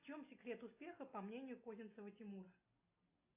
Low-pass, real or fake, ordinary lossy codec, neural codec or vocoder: 3.6 kHz; real; AAC, 32 kbps; none